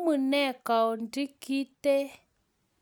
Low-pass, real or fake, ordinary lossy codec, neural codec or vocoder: none; real; none; none